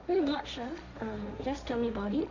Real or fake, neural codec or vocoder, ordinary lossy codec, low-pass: fake; codec, 16 kHz, 1.1 kbps, Voila-Tokenizer; none; 7.2 kHz